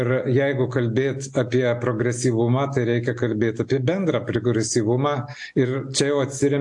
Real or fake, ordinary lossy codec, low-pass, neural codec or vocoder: real; AAC, 64 kbps; 10.8 kHz; none